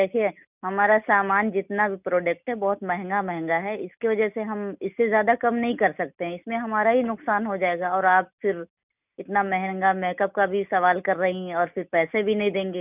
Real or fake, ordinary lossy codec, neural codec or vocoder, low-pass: real; none; none; 3.6 kHz